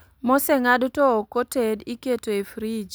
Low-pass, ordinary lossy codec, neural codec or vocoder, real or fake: none; none; none; real